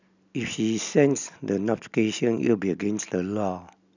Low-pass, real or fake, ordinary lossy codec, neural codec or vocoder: 7.2 kHz; real; none; none